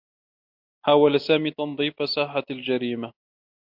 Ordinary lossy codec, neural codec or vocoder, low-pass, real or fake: MP3, 48 kbps; none; 5.4 kHz; real